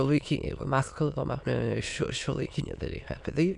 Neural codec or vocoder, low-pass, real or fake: autoencoder, 22.05 kHz, a latent of 192 numbers a frame, VITS, trained on many speakers; 9.9 kHz; fake